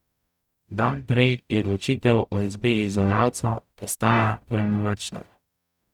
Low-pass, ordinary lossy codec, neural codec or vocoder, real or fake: 19.8 kHz; none; codec, 44.1 kHz, 0.9 kbps, DAC; fake